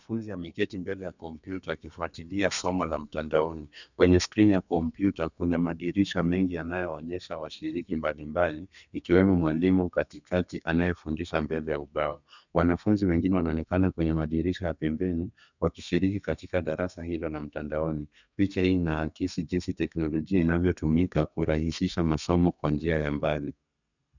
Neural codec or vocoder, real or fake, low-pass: codec, 32 kHz, 1.9 kbps, SNAC; fake; 7.2 kHz